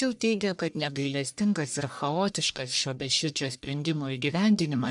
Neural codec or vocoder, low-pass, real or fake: codec, 44.1 kHz, 1.7 kbps, Pupu-Codec; 10.8 kHz; fake